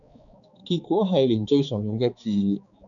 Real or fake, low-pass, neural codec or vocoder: fake; 7.2 kHz; codec, 16 kHz, 4 kbps, X-Codec, HuBERT features, trained on balanced general audio